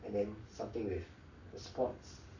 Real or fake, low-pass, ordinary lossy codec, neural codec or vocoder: fake; 7.2 kHz; none; codec, 44.1 kHz, 7.8 kbps, Pupu-Codec